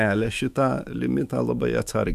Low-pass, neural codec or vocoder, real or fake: 14.4 kHz; autoencoder, 48 kHz, 128 numbers a frame, DAC-VAE, trained on Japanese speech; fake